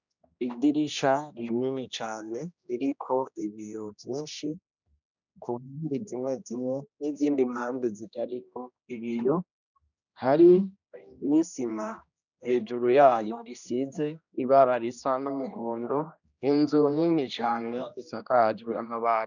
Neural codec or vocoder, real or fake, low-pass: codec, 16 kHz, 1 kbps, X-Codec, HuBERT features, trained on general audio; fake; 7.2 kHz